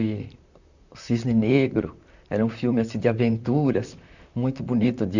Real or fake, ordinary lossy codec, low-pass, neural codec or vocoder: fake; none; 7.2 kHz; vocoder, 44.1 kHz, 128 mel bands, Pupu-Vocoder